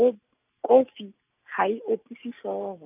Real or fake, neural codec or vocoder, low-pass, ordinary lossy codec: real; none; 3.6 kHz; none